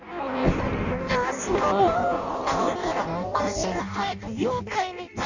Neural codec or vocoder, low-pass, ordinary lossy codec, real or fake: codec, 16 kHz in and 24 kHz out, 0.6 kbps, FireRedTTS-2 codec; 7.2 kHz; none; fake